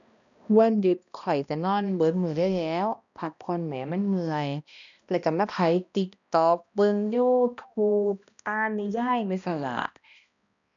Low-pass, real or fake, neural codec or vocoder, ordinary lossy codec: 7.2 kHz; fake; codec, 16 kHz, 1 kbps, X-Codec, HuBERT features, trained on balanced general audio; none